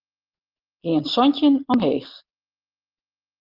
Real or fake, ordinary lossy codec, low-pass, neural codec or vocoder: real; Opus, 32 kbps; 5.4 kHz; none